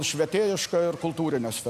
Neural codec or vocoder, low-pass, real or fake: none; 14.4 kHz; real